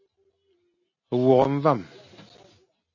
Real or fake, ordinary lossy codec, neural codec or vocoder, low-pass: real; MP3, 32 kbps; none; 7.2 kHz